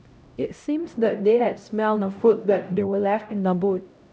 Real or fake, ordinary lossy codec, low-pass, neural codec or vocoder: fake; none; none; codec, 16 kHz, 0.5 kbps, X-Codec, HuBERT features, trained on LibriSpeech